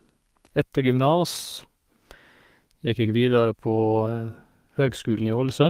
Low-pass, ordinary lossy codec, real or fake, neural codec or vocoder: 14.4 kHz; Opus, 32 kbps; fake; codec, 32 kHz, 1.9 kbps, SNAC